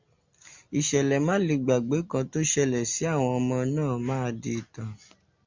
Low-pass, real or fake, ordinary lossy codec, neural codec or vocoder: 7.2 kHz; real; MP3, 64 kbps; none